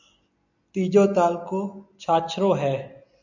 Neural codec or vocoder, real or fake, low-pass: none; real; 7.2 kHz